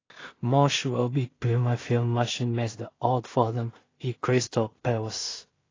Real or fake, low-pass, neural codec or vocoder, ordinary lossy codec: fake; 7.2 kHz; codec, 16 kHz in and 24 kHz out, 0.4 kbps, LongCat-Audio-Codec, two codebook decoder; AAC, 32 kbps